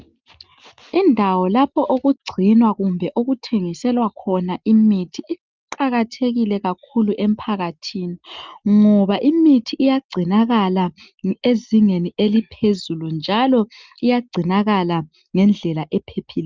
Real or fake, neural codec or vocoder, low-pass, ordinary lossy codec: real; none; 7.2 kHz; Opus, 24 kbps